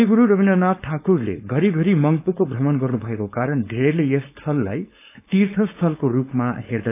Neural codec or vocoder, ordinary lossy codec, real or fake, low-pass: codec, 16 kHz, 4.8 kbps, FACodec; AAC, 24 kbps; fake; 3.6 kHz